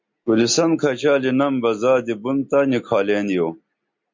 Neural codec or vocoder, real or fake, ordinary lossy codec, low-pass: none; real; MP3, 48 kbps; 7.2 kHz